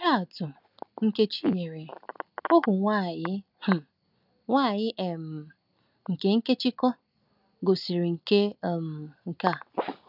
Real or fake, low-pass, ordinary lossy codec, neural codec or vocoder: real; 5.4 kHz; none; none